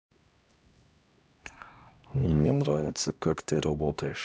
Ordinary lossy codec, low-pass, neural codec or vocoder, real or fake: none; none; codec, 16 kHz, 1 kbps, X-Codec, HuBERT features, trained on LibriSpeech; fake